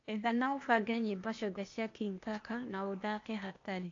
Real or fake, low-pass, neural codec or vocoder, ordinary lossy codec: fake; 7.2 kHz; codec, 16 kHz, 0.8 kbps, ZipCodec; AAC, 48 kbps